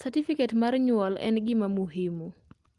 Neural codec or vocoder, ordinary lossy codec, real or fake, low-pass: none; Opus, 24 kbps; real; 10.8 kHz